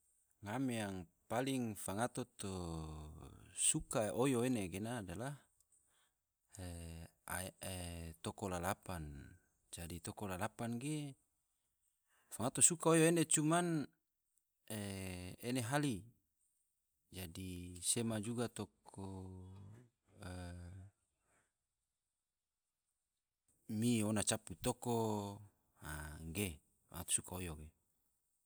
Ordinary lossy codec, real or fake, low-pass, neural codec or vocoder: none; real; none; none